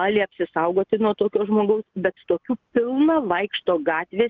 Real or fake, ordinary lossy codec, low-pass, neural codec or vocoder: real; Opus, 24 kbps; 7.2 kHz; none